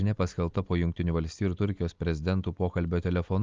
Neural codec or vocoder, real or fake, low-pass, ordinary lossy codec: none; real; 7.2 kHz; Opus, 32 kbps